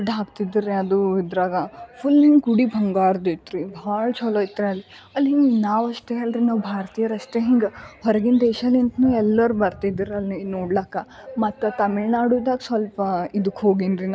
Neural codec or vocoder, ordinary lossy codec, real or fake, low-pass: none; none; real; none